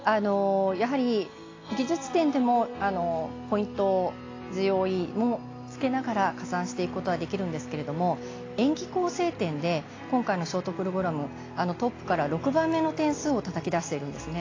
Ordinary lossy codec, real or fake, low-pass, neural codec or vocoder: AAC, 32 kbps; real; 7.2 kHz; none